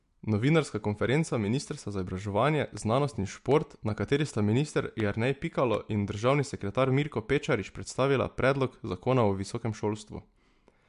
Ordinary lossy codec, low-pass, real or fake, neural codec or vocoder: MP3, 64 kbps; 10.8 kHz; real; none